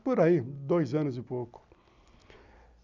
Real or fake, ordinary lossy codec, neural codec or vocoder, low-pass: real; none; none; 7.2 kHz